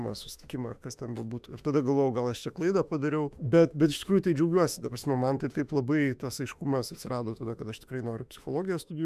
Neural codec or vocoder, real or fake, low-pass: autoencoder, 48 kHz, 32 numbers a frame, DAC-VAE, trained on Japanese speech; fake; 14.4 kHz